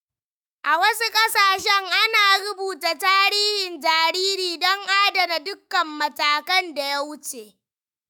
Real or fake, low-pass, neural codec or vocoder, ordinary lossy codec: fake; none; autoencoder, 48 kHz, 128 numbers a frame, DAC-VAE, trained on Japanese speech; none